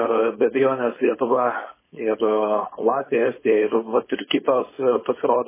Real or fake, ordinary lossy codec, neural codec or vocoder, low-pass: fake; MP3, 16 kbps; codec, 16 kHz, 4.8 kbps, FACodec; 3.6 kHz